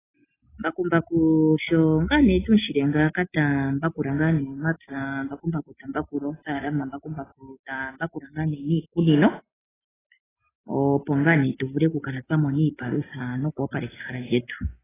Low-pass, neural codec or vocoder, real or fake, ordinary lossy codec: 3.6 kHz; none; real; AAC, 16 kbps